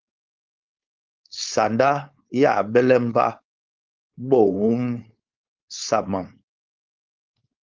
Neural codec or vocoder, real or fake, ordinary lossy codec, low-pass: codec, 16 kHz, 4.8 kbps, FACodec; fake; Opus, 24 kbps; 7.2 kHz